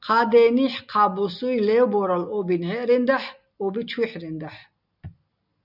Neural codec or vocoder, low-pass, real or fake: none; 5.4 kHz; real